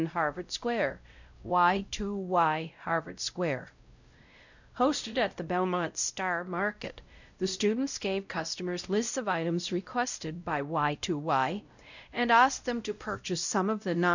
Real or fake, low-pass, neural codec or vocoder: fake; 7.2 kHz; codec, 16 kHz, 0.5 kbps, X-Codec, WavLM features, trained on Multilingual LibriSpeech